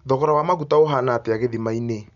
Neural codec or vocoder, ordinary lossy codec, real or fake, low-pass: none; none; real; 7.2 kHz